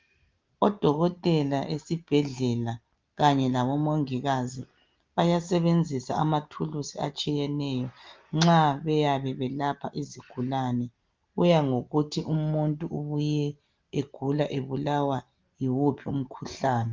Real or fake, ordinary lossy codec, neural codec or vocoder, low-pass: real; Opus, 32 kbps; none; 7.2 kHz